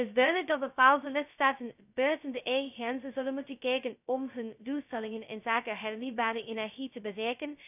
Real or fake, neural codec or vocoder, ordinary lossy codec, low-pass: fake; codec, 16 kHz, 0.2 kbps, FocalCodec; none; 3.6 kHz